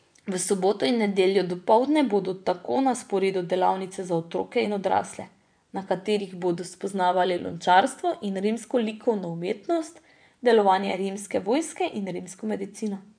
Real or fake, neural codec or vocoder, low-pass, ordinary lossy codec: fake; vocoder, 24 kHz, 100 mel bands, Vocos; 9.9 kHz; none